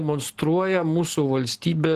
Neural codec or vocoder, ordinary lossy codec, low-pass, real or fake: none; Opus, 16 kbps; 14.4 kHz; real